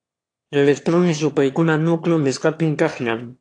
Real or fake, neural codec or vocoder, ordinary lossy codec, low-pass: fake; autoencoder, 22.05 kHz, a latent of 192 numbers a frame, VITS, trained on one speaker; AAC, 48 kbps; 9.9 kHz